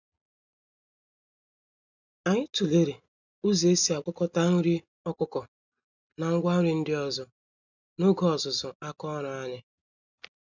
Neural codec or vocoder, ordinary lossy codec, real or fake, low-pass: none; none; real; 7.2 kHz